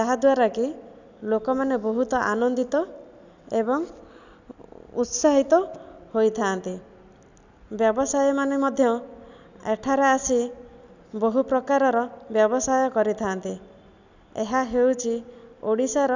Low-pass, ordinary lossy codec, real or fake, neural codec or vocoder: 7.2 kHz; none; real; none